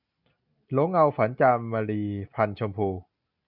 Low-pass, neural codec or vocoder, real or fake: 5.4 kHz; none; real